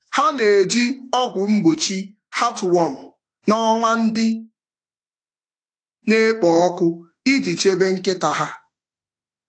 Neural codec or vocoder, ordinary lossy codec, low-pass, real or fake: autoencoder, 48 kHz, 32 numbers a frame, DAC-VAE, trained on Japanese speech; AAC, 48 kbps; 9.9 kHz; fake